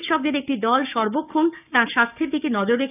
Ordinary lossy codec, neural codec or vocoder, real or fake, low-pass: none; codec, 16 kHz, 6 kbps, DAC; fake; 3.6 kHz